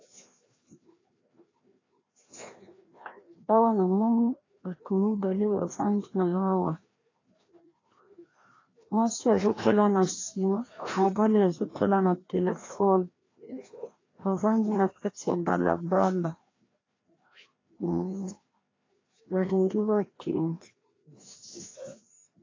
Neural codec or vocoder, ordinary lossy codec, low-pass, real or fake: codec, 16 kHz, 1 kbps, FreqCodec, larger model; AAC, 32 kbps; 7.2 kHz; fake